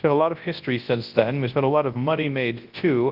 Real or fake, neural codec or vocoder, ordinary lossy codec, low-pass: fake; codec, 24 kHz, 0.9 kbps, WavTokenizer, large speech release; Opus, 32 kbps; 5.4 kHz